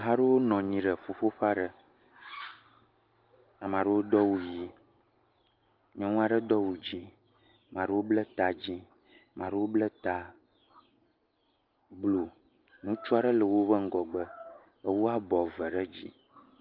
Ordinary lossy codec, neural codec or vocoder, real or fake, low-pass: Opus, 24 kbps; none; real; 5.4 kHz